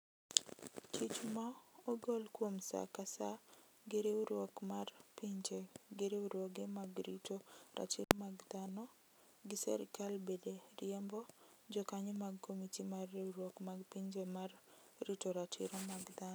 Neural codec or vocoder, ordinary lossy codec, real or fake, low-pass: none; none; real; none